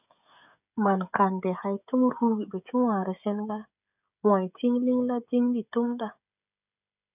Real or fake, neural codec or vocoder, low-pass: fake; codec, 16 kHz, 16 kbps, FreqCodec, smaller model; 3.6 kHz